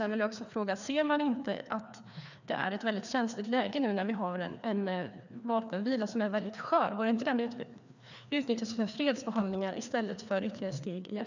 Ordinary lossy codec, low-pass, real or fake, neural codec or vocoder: none; 7.2 kHz; fake; codec, 16 kHz, 2 kbps, FreqCodec, larger model